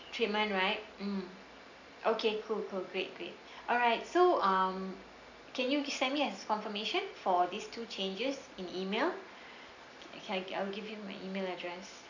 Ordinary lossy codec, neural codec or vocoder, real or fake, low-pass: MP3, 64 kbps; none; real; 7.2 kHz